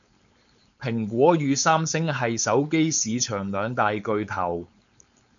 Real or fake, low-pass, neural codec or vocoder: fake; 7.2 kHz; codec, 16 kHz, 4.8 kbps, FACodec